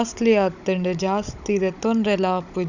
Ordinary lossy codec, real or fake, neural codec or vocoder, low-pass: none; fake; codec, 16 kHz, 16 kbps, FunCodec, trained on Chinese and English, 50 frames a second; 7.2 kHz